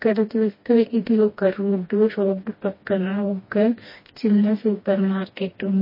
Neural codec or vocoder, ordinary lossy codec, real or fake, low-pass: codec, 16 kHz, 1 kbps, FreqCodec, smaller model; MP3, 24 kbps; fake; 5.4 kHz